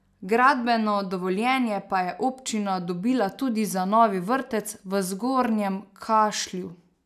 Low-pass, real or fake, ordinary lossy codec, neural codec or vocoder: 14.4 kHz; real; none; none